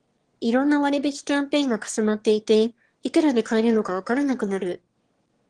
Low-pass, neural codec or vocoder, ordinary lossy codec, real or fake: 9.9 kHz; autoencoder, 22.05 kHz, a latent of 192 numbers a frame, VITS, trained on one speaker; Opus, 16 kbps; fake